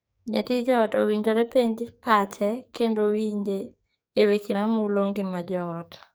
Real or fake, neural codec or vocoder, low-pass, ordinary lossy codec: fake; codec, 44.1 kHz, 2.6 kbps, SNAC; none; none